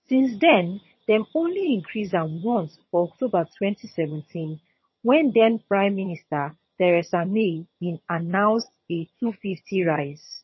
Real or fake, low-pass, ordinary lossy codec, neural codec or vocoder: fake; 7.2 kHz; MP3, 24 kbps; vocoder, 22.05 kHz, 80 mel bands, HiFi-GAN